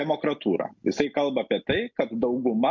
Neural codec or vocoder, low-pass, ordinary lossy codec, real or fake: none; 7.2 kHz; MP3, 48 kbps; real